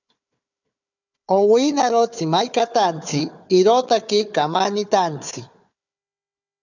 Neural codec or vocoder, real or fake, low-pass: codec, 16 kHz, 4 kbps, FunCodec, trained on Chinese and English, 50 frames a second; fake; 7.2 kHz